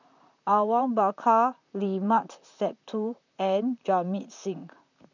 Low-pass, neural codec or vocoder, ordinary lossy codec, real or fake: 7.2 kHz; vocoder, 44.1 kHz, 128 mel bands, Pupu-Vocoder; none; fake